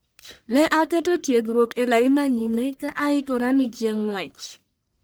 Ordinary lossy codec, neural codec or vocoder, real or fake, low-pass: none; codec, 44.1 kHz, 1.7 kbps, Pupu-Codec; fake; none